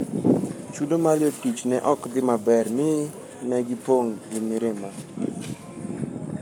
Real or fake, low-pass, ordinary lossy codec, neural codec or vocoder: fake; none; none; codec, 44.1 kHz, 7.8 kbps, Pupu-Codec